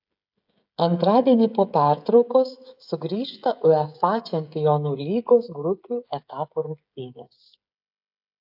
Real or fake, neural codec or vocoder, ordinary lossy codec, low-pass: fake; codec, 16 kHz, 16 kbps, FreqCodec, smaller model; AAC, 48 kbps; 5.4 kHz